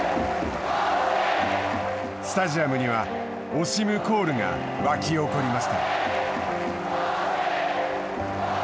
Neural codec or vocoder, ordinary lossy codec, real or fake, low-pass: none; none; real; none